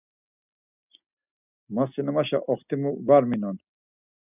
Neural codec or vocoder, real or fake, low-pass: none; real; 3.6 kHz